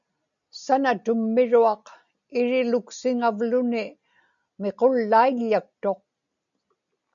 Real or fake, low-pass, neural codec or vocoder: real; 7.2 kHz; none